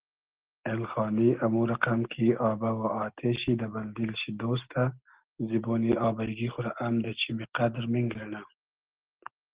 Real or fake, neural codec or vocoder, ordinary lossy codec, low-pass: real; none; Opus, 16 kbps; 3.6 kHz